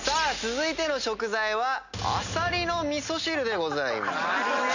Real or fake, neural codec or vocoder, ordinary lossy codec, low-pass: real; none; none; 7.2 kHz